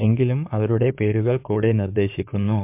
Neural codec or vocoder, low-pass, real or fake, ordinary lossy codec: codec, 16 kHz in and 24 kHz out, 2.2 kbps, FireRedTTS-2 codec; 3.6 kHz; fake; MP3, 32 kbps